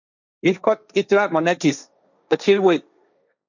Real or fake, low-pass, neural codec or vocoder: fake; 7.2 kHz; codec, 16 kHz, 1.1 kbps, Voila-Tokenizer